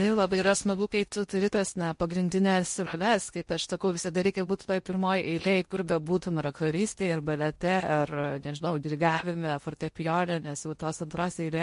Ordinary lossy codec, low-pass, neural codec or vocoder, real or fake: MP3, 48 kbps; 10.8 kHz; codec, 16 kHz in and 24 kHz out, 0.8 kbps, FocalCodec, streaming, 65536 codes; fake